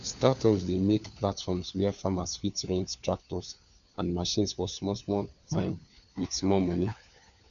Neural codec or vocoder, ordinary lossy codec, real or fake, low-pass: codec, 16 kHz, 4 kbps, FunCodec, trained on LibriTTS, 50 frames a second; none; fake; 7.2 kHz